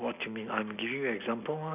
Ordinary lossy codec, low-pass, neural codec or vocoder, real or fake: none; 3.6 kHz; none; real